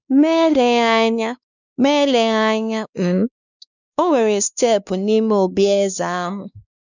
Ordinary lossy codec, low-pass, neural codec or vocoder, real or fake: none; 7.2 kHz; codec, 16 kHz, 2 kbps, X-Codec, WavLM features, trained on Multilingual LibriSpeech; fake